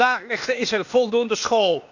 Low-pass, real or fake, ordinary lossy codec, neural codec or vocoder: 7.2 kHz; fake; none; codec, 16 kHz, 0.8 kbps, ZipCodec